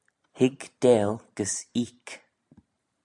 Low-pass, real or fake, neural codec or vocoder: 10.8 kHz; fake; vocoder, 44.1 kHz, 128 mel bands every 512 samples, BigVGAN v2